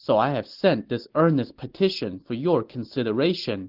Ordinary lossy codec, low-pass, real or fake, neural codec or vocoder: Opus, 16 kbps; 5.4 kHz; real; none